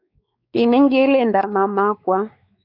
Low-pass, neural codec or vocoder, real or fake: 5.4 kHz; codec, 16 kHz, 4 kbps, X-Codec, WavLM features, trained on Multilingual LibriSpeech; fake